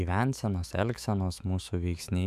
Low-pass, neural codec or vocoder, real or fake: 14.4 kHz; autoencoder, 48 kHz, 128 numbers a frame, DAC-VAE, trained on Japanese speech; fake